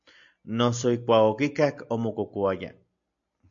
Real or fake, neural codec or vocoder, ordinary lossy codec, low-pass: real; none; MP3, 64 kbps; 7.2 kHz